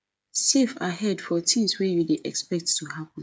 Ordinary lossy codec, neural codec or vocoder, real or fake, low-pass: none; codec, 16 kHz, 8 kbps, FreqCodec, smaller model; fake; none